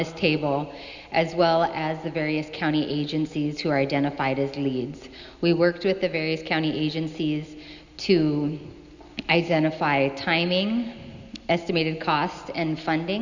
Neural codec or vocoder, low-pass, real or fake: none; 7.2 kHz; real